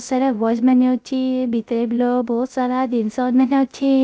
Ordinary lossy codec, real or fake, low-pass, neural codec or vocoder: none; fake; none; codec, 16 kHz, 0.3 kbps, FocalCodec